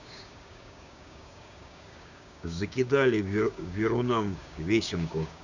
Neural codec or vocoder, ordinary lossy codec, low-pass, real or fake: codec, 16 kHz, 6 kbps, DAC; none; 7.2 kHz; fake